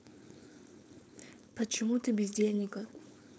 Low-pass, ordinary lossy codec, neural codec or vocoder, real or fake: none; none; codec, 16 kHz, 4.8 kbps, FACodec; fake